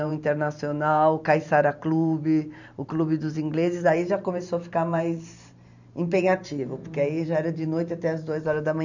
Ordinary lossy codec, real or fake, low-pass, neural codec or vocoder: none; fake; 7.2 kHz; vocoder, 44.1 kHz, 128 mel bands every 512 samples, BigVGAN v2